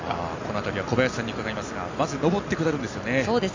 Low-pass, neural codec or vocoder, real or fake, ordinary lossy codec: 7.2 kHz; none; real; AAC, 48 kbps